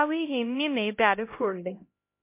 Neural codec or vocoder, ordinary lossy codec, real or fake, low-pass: codec, 16 kHz, 0.5 kbps, X-Codec, HuBERT features, trained on LibriSpeech; MP3, 24 kbps; fake; 3.6 kHz